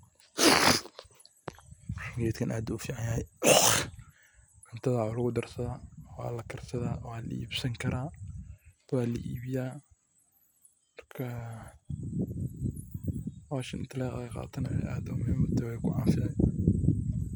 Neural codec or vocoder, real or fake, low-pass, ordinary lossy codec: none; real; none; none